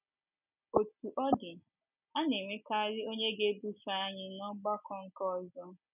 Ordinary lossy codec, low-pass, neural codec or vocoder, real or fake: none; 3.6 kHz; none; real